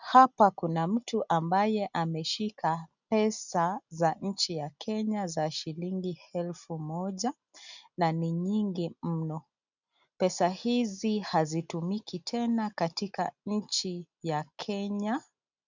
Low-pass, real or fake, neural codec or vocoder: 7.2 kHz; real; none